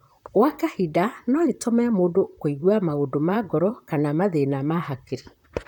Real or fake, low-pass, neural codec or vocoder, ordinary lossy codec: fake; 19.8 kHz; vocoder, 44.1 kHz, 128 mel bands, Pupu-Vocoder; none